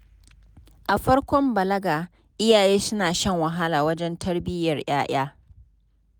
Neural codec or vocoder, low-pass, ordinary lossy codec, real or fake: none; none; none; real